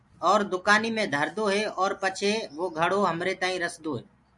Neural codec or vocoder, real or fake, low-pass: none; real; 10.8 kHz